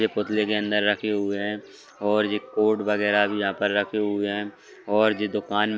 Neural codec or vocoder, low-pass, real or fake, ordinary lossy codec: none; none; real; none